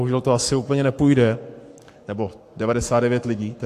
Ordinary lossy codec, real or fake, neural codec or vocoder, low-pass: AAC, 64 kbps; fake; codec, 44.1 kHz, 7.8 kbps, Pupu-Codec; 14.4 kHz